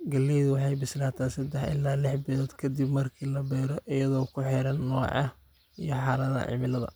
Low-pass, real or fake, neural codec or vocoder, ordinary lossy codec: none; real; none; none